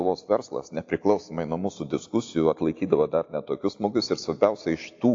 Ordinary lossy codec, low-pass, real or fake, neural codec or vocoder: AAC, 48 kbps; 7.2 kHz; real; none